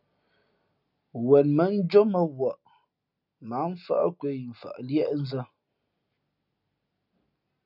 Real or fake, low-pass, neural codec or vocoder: real; 5.4 kHz; none